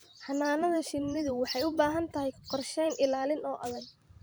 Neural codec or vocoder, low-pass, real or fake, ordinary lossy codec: vocoder, 44.1 kHz, 128 mel bands every 512 samples, BigVGAN v2; none; fake; none